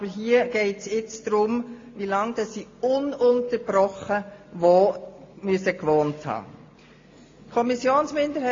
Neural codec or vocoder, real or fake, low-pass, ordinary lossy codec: none; real; 7.2 kHz; AAC, 32 kbps